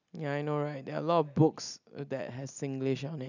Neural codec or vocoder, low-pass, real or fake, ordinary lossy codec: none; 7.2 kHz; real; none